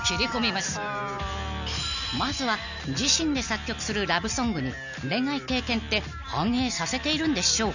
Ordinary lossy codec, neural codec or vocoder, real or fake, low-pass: none; none; real; 7.2 kHz